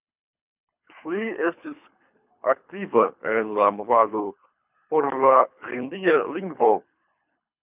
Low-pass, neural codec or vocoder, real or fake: 3.6 kHz; codec, 24 kHz, 3 kbps, HILCodec; fake